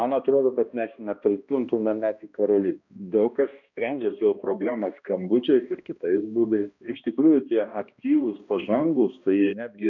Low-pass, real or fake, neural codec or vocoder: 7.2 kHz; fake; codec, 16 kHz, 1 kbps, X-Codec, HuBERT features, trained on balanced general audio